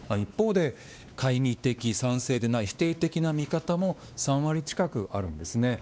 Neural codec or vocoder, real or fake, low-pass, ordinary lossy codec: codec, 16 kHz, 2 kbps, X-Codec, WavLM features, trained on Multilingual LibriSpeech; fake; none; none